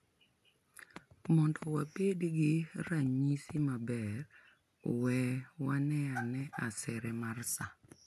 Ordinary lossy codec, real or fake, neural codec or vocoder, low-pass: none; real; none; 14.4 kHz